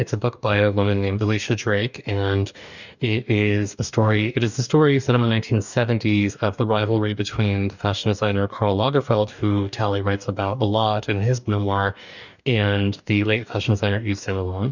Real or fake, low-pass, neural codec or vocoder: fake; 7.2 kHz; codec, 44.1 kHz, 2.6 kbps, DAC